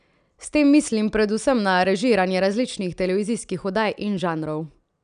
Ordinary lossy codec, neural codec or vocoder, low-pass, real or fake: none; none; 9.9 kHz; real